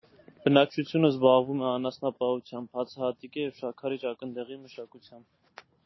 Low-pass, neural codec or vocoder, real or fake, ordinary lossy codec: 7.2 kHz; none; real; MP3, 24 kbps